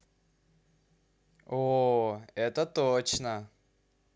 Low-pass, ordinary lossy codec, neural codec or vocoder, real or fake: none; none; none; real